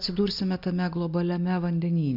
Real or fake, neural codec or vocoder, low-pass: real; none; 5.4 kHz